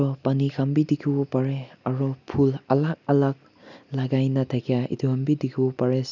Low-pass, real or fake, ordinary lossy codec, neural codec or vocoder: 7.2 kHz; real; none; none